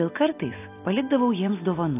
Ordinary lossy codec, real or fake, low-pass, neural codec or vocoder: AAC, 24 kbps; real; 3.6 kHz; none